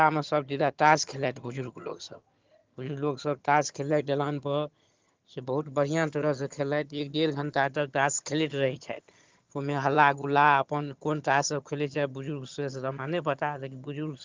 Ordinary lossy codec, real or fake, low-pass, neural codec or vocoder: Opus, 32 kbps; fake; 7.2 kHz; vocoder, 22.05 kHz, 80 mel bands, HiFi-GAN